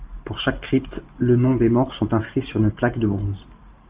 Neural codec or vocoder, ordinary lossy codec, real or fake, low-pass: none; Opus, 24 kbps; real; 3.6 kHz